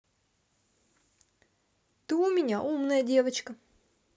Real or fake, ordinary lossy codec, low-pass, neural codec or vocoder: real; none; none; none